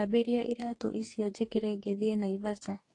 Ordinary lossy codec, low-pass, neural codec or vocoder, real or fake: AAC, 48 kbps; 10.8 kHz; codec, 44.1 kHz, 2.6 kbps, DAC; fake